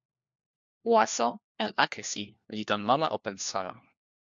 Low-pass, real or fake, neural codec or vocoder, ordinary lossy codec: 7.2 kHz; fake; codec, 16 kHz, 1 kbps, FunCodec, trained on LibriTTS, 50 frames a second; MP3, 64 kbps